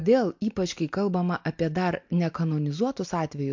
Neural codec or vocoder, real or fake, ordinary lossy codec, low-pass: none; real; MP3, 48 kbps; 7.2 kHz